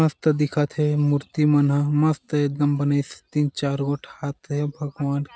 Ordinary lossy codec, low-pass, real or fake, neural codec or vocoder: none; none; real; none